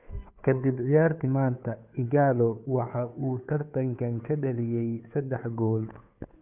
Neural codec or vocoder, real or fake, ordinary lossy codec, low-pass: codec, 16 kHz, 2 kbps, FunCodec, trained on Chinese and English, 25 frames a second; fake; none; 3.6 kHz